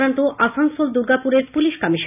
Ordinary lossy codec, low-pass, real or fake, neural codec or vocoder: none; 3.6 kHz; real; none